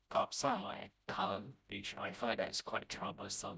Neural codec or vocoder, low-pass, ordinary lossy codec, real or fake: codec, 16 kHz, 0.5 kbps, FreqCodec, smaller model; none; none; fake